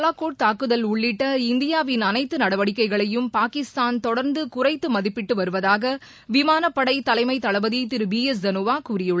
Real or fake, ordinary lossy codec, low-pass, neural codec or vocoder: real; none; none; none